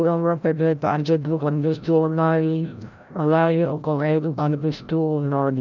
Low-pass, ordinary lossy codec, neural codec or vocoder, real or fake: 7.2 kHz; none; codec, 16 kHz, 0.5 kbps, FreqCodec, larger model; fake